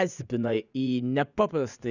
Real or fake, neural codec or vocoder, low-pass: fake; vocoder, 24 kHz, 100 mel bands, Vocos; 7.2 kHz